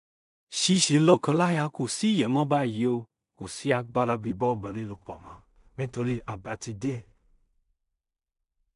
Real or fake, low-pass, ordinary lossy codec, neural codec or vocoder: fake; 10.8 kHz; none; codec, 16 kHz in and 24 kHz out, 0.4 kbps, LongCat-Audio-Codec, two codebook decoder